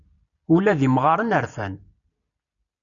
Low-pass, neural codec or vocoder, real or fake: 7.2 kHz; none; real